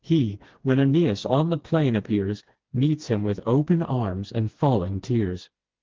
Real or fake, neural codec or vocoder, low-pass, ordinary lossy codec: fake; codec, 16 kHz, 2 kbps, FreqCodec, smaller model; 7.2 kHz; Opus, 16 kbps